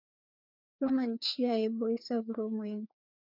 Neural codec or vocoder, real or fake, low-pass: codec, 16 kHz, 2 kbps, FreqCodec, larger model; fake; 5.4 kHz